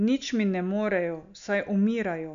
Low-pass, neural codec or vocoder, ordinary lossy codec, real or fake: 7.2 kHz; none; MP3, 96 kbps; real